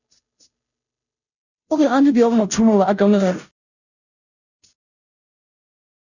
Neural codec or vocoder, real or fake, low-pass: codec, 16 kHz, 0.5 kbps, FunCodec, trained on Chinese and English, 25 frames a second; fake; 7.2 kHz